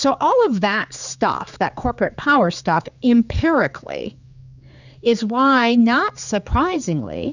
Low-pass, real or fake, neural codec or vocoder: 7.2 kHz; fake; codec, 16 kHz, 4 kbps, X-Codec, HuBERT features, trained on general audio